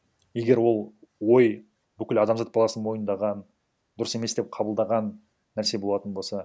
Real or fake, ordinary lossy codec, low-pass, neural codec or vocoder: real; none; none; none